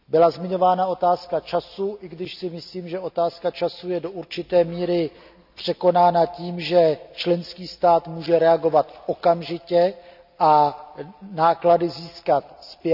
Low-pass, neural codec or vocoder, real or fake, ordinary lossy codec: 5.4 kHz; none; real; none